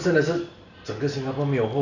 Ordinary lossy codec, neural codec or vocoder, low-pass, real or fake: none; none; 7.2 kHz; real